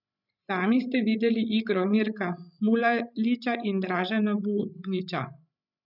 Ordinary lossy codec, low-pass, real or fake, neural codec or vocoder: none; 5.4 kHz; fake; codec, 16 kHz, 16 kbps, FreqCodec, larger model